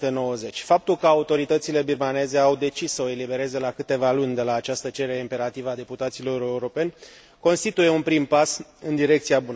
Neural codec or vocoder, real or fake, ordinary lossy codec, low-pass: none; real; none; none